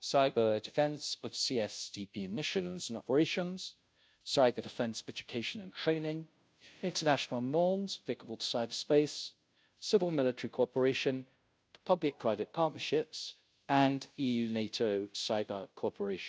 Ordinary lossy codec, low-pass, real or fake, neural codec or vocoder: none; none; fake; codec, 16 kHz, 0.5 kbps, FunCodec, trained on Chinese and English, 25 frames a second